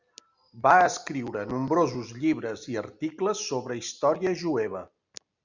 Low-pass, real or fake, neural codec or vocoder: 7.2 kHz; real; none